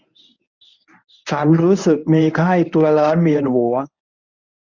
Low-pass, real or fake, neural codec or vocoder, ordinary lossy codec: 7.2 kHz; fake; codec, 24 kHz, 0.9 kbps, WavTokenizer, medium speech release version 1; none